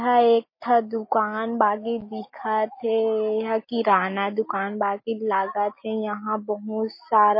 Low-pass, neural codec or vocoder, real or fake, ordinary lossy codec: 5.4 kHz; none; real; MP3, 24 kbps